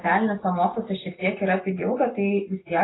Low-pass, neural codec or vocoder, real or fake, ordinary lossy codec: 7.2 kHz; none; real; AAC, 16 kbps